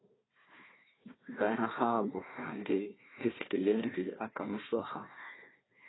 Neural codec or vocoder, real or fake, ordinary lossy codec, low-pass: codec, 16 kHz, 1 kbps, FunCodec, trained on Chinese and English, 50 frames a second; fake; AAC, 16 kbps; 7.2 kHz